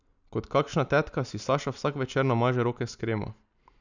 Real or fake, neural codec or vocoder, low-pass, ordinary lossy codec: real; none; 7.2 kHz; none